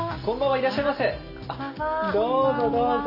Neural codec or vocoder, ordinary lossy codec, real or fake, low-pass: none; none; real; 5.4 kHz